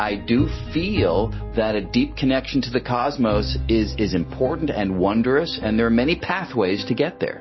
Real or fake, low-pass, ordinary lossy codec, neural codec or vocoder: real; 7.2 kHz; MP3, 24 kbps; none